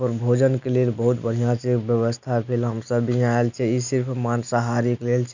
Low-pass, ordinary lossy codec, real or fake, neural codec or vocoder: 7.2 kHz; none; real; none